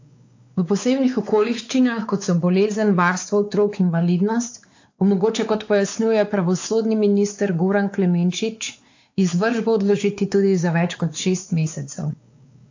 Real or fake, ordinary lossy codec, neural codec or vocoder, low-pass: fake; AAC, 48 kbps; codec, 16 kHz, 4 kbps, X-Codec, WavLM features, trained on Multilingual LibriSpeech; 7.2 kHz